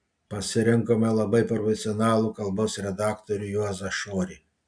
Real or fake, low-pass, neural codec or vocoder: real; 9.9 kHz; none